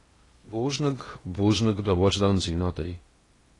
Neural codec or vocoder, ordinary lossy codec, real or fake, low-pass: codec, 16 kHz in and 24 kHz out, 0.8 kbps, FocalCodec, streaming, 65536 codes; AAC, 32 kbps; fake; 10.8 kHz